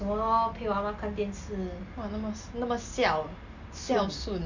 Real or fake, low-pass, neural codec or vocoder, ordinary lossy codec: real; 7.2 kHz; none; none